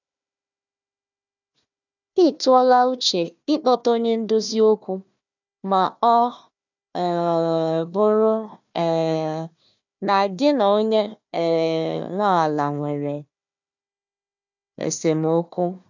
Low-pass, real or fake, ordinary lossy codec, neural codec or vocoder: 7.2 kHz; fake; none; codec, 16 kHz, 1 kbps, FunCodec, trained on Chinese and English, 50 frames a second